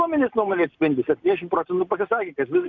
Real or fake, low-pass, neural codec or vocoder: real; 7.2 kHz; none